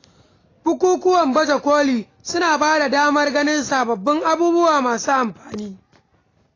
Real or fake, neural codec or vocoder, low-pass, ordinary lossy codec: real; none; 7.2 kHz; AAC, 32 kbps